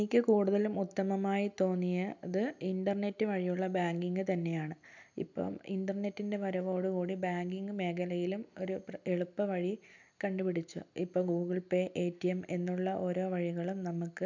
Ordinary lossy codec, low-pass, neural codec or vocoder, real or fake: none; 7.2 kHz; none; real